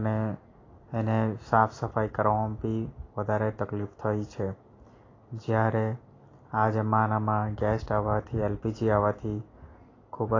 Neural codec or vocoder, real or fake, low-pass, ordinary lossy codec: none; real; 7.2 kHz; AAC, 32 kbps